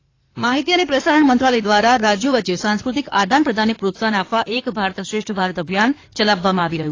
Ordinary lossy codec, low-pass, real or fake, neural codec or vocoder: AAC, 32 kbps; 7.2 kHz; fake; codec, 16 kHz in and 24 kHz out, 2.2 kbps, FireRedTTS-2 codec